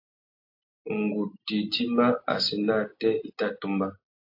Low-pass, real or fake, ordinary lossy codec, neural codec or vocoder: 5.4 kHz; real; AAC, 32 kbps; none